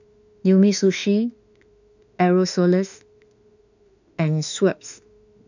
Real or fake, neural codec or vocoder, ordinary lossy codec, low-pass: fake; autoencoder, 48 kHz, 32 numbers a frame, DAC-VAE, trained on Japanese speech; none; 7.2 kHz